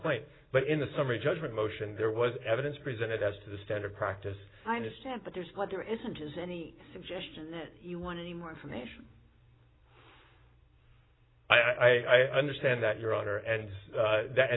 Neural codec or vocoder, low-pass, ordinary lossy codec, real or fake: none; 7.2 kHz; AAC, 16 kbps; real